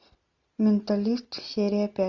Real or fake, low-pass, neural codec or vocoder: real; 7.2 kHz; none